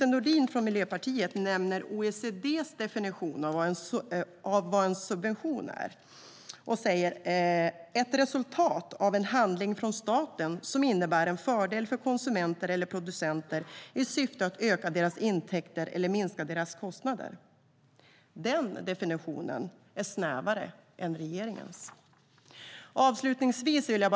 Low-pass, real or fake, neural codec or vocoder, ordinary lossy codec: none; real; none; none